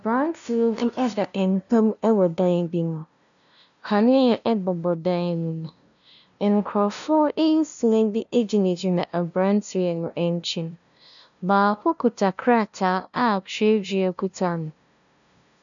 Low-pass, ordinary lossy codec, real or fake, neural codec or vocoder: 7.2 kHz; MP3, 96 kbps; fake; codec, 16 kHz, 0.5 kbps, FunCodec, trained on LibriTTS, 25 frames a second